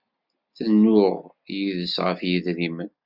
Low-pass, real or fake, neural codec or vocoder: 5.4 kHz; real; none